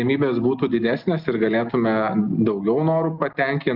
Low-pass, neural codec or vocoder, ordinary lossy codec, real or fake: 5.4 kHz; none; Opus, 32 kbps; real